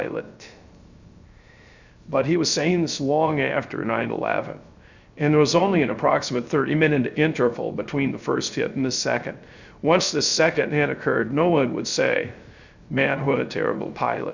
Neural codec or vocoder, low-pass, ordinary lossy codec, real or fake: codec, 16 kHz, 0.3 kbps, FocalCodec; 7.2 kHz; Opus, 64 kbps; fake